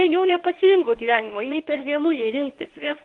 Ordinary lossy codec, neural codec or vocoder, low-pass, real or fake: Opus, 24 kbps; codec, 24 kHz, 0.9 kbps, WavTokenizer, medium speech release version 1; 10.8 kHz; fake